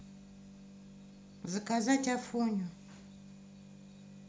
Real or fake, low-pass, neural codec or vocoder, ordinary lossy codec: real; none; none; none